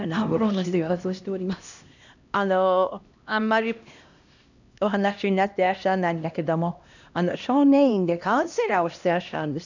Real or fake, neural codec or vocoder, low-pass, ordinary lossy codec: fake; codec, 16 kHz, 1 kbps, X-Codec, HuBERT features, trained on LibriSpeech; 7.2 kHz; none